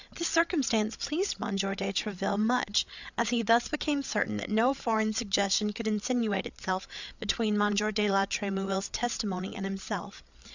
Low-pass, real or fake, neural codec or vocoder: 7.2 kHz; fake; codec, 16 kHz, 8 kbps, FreqCodec, larger model